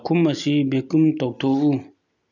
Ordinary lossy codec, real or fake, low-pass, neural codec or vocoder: none; real; 7.2 kHz; none